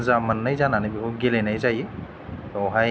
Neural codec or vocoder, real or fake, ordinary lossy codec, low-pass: none; real; none; none